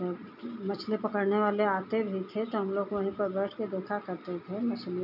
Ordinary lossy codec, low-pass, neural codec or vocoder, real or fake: none; 5.4 kHz; none; real